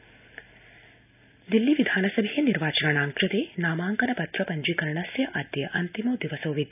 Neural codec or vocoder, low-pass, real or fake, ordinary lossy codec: none; 3.6 kHz; real; none